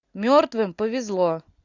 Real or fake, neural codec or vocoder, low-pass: real; none; 7.2 kHz